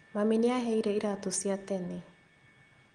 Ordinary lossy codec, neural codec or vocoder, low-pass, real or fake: Opus, 24 kbps; none; 9.9 kHz; real